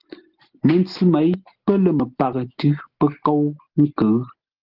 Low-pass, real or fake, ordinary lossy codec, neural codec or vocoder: 5.4 kHz; real; Opus, 16 kbps; none